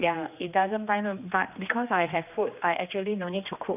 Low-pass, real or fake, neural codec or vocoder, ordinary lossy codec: 3.6 kHz; fake; codec, 16 kHz, 2 kbps, X-Codec, HuBERT features, trained on general audio; none